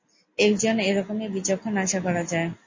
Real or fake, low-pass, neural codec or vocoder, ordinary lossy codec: real; 7.2 kHz; none; MP3, 32 kbps